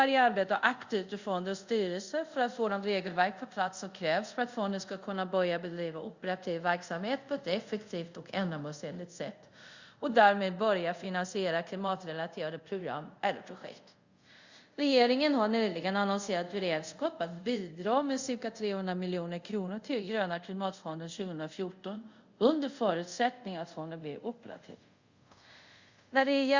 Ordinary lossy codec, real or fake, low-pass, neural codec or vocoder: Opus, 64 kbps; fake; 7.2 kHz; codec, 24 kHz, 0.5 kbps, DualCodec